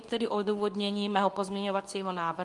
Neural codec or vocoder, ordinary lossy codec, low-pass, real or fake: codec, 24 kHz, 0.9 kbps, WavTokenizer, medium speech release version 2; Opus, 32 kbps; 10.8 kHz; fake